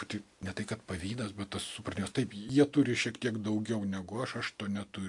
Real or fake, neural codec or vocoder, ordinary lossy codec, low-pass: real; none; MP3, 64 kbps; 10.8 kHz